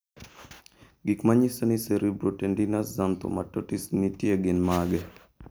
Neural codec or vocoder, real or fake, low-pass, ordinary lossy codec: none; real; none; none